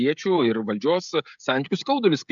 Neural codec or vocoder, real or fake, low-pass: codec, 16 kHz, 16 kbps, FreqCodec, smaller model; fake; 7.2 kHz